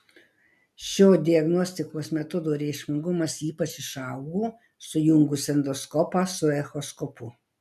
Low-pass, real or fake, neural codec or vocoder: 14.4 kHz; real; none